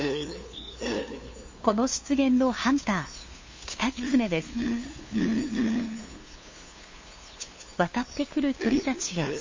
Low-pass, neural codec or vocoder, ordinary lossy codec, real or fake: 7.2 kHz; codec, 16 kHz, 2 kbps, FunCodec, trained on LibriTTS, 25 frames a second; MP3, 32 kbps; fake